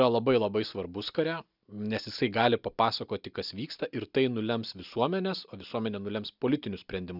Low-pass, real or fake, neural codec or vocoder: 5.4 kHz; real; none